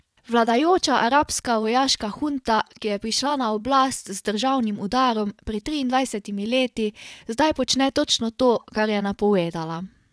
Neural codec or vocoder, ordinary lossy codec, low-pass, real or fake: vocoder, 22.05 kHz, 80 mel bands, WaveNeXt; none; none; fake